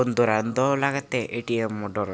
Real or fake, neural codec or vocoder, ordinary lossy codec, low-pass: real; none; none; none